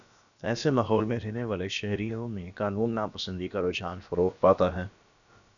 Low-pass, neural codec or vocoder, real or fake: 7.2 kHz; codec, 16 kHz, about 1 kbps, DyCAST, with the encoder's durations; fake